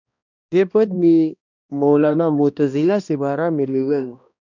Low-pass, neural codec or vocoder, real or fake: 7.2 kHz; codec, 16 kHz, 1 kbps, X-Codec, HuBERT features, trained on balanced general audio; fake